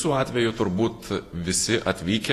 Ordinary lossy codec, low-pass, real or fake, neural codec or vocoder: AAC, 48 kbps; 14.4 kHz; fake; vocoder, 48 kHz, 128 mel bands, Vocos